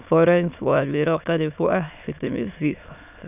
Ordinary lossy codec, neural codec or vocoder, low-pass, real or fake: none; autoencoder, 22.05 kHz, a latent of 192 numbers a frame, VITS, trained on many speakers; 3.6 kHz; fake